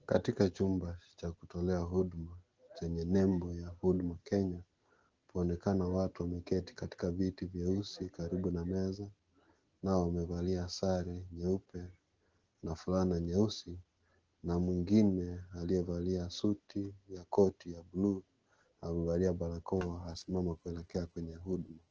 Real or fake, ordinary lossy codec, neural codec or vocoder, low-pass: real; Opus, 16 kbps; none; 7.2 kHz